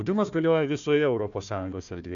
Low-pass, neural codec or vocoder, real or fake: 7.2 kHz; codec, 16 kHz, 1 kbps, FunCodec, trained on Chinese and English, 50 frames a second; fake